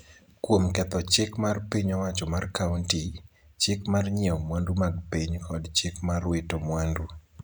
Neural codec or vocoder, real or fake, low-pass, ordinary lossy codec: none; real; none; none